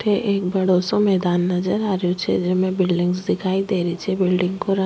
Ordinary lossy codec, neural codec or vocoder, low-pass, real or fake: none; none; none; real